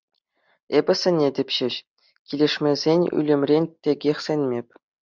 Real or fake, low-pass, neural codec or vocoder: real; 7.2 kHz; none